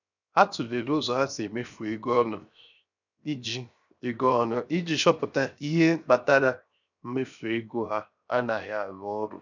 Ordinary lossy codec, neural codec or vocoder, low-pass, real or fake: none; codec, 16 kHz, 0.7 kbps, FocalCodec; 7.2 kHz; fake